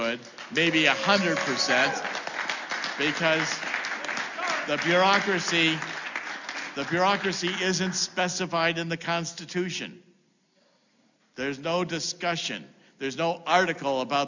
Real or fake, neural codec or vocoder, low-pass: real; none; 7.2 kHz